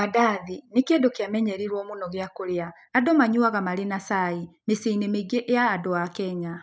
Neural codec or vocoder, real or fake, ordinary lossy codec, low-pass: none; real; none; none